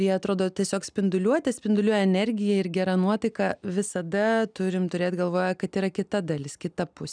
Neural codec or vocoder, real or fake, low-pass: none; real; 9.9 kHz